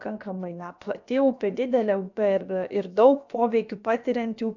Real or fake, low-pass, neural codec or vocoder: fake; 7.2 kHz; codec, 16 kHz, about 1 kbps, DyCAST, with the encoder's durations